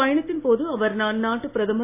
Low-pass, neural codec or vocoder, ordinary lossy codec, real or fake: 3.6 kHz; none; AAC, 24 kbps; real